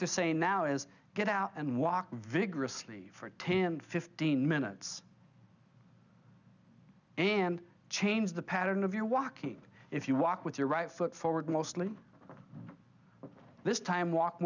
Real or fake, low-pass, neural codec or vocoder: real; 7.2 kHz; none